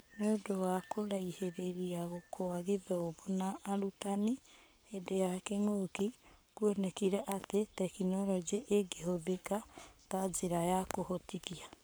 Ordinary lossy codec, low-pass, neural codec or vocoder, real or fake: none; none; codec, 44.1 kHz, 7.8 kbps, Pupu-Codec; fake